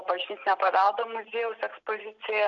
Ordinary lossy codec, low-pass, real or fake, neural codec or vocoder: MP3, 96 kbps; 7.2 kHz; real; none